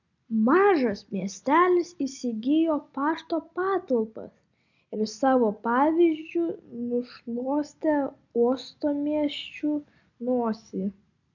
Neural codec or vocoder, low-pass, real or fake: none; 7.2 kHz; real